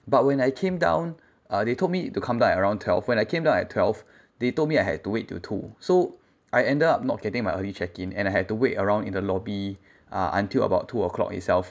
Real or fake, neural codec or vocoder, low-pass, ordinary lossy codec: real; none; none; none